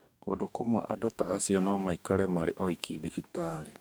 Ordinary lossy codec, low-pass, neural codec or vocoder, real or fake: none; none; codec, 44.1 kHz, 2.6 kbps, DAC; fake